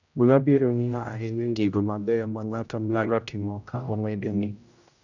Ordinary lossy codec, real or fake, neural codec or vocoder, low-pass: none; fake; codec, 16 kHz, 0.5 kbps, X-Codec, HuBERT features, trained on general audio; 7.2 kHz